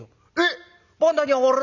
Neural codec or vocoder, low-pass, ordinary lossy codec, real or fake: none; 7.2 kHz; none; real